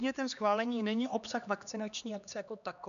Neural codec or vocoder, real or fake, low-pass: codec, 16 kHz, 2 kbps, X-Codec, HuBERT features, trained on LibriSpeech; fake; 7.2 kHz